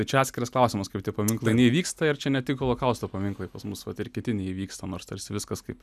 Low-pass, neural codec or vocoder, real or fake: 14.4 kHz; none; real